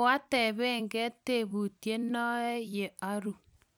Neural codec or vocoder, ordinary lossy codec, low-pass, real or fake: vocoder, 44.1 kHz, 128 mel bands every 256 samples, BigVGAN v2; none; none; fake